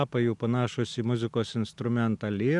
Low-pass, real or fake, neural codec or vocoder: 10.8 kHz; real; none